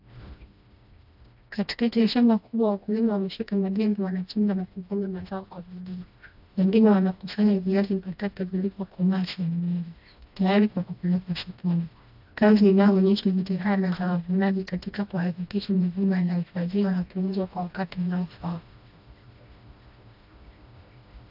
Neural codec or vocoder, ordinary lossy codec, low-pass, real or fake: codec, 16 kHz, 1 kbps, FreqCodec, smaller model; AAC, 48 kbps; 5.4 kHz; fake